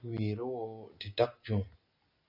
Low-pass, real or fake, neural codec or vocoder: 5.4 kHz; real; none